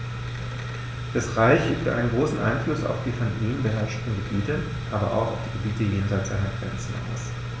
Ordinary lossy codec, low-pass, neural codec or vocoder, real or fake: none; none; none; real